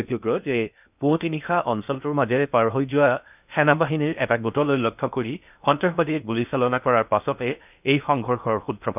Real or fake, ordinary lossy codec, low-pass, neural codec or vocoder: fake; none; 3.6 kHz; codec, 16 kHz in and 24 kHz out, 0.6 kbps, FocalCodec, streaming, 2048 codes